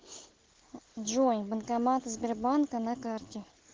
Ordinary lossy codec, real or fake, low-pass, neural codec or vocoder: Opus, 16 kbps; real; 7.2 kHz; none